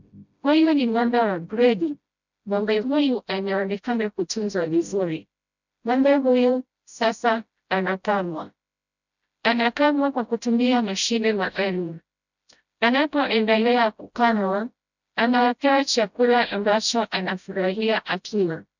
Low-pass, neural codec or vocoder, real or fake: 7.2 kHz; codec, 16 kHz, 0.5 kbps, FreqCodec, smaller model; fake